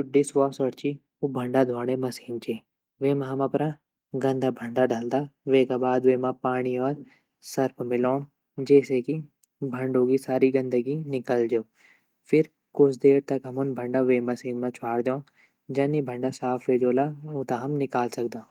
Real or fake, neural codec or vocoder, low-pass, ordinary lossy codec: real; none; 19.8 kHz; Opus, 32 kbps